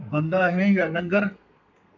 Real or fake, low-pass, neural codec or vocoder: fake; 7.2 kHz; codec, 32 kHz, 1.9 kbps, SNAC